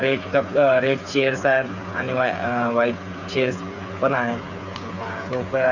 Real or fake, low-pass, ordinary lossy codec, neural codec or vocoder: fake; 7.2 kHz; none; codec, 16 kHz, 8 kbps, FreqCodec, smaller model